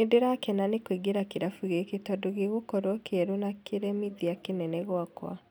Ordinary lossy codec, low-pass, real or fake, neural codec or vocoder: none; none; real; none